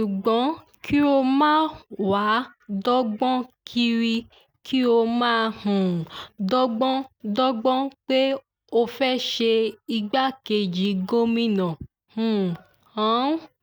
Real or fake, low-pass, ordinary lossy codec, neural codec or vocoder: real; none; none; none